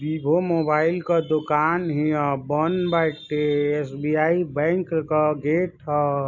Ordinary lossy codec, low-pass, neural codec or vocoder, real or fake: none; 7.2 kHz; none; real